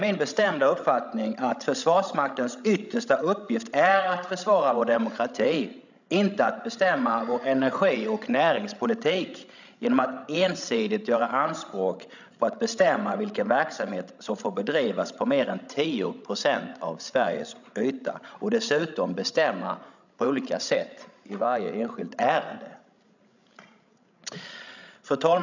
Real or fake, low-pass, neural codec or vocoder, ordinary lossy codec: fake; 7.2 kHz; codec, 16 kHz, 16 kbps, FreqCodec, larger model; none